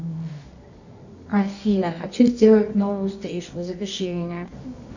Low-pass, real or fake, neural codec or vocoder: 7.2 kHz; fake; codec, 24 kHz, 0.9 kbps, WavTokenizer, medium music audio release